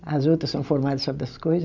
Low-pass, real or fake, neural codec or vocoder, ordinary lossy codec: 7.2 kHz; real; none; none